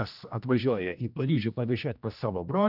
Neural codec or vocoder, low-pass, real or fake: codec, 16 kHz, 1 kbps, X-Codec, HuBERT features, trained on general audio; 5.4 kHz; fake